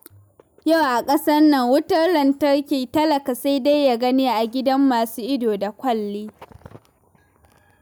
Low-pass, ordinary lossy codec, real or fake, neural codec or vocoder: none; none; real; none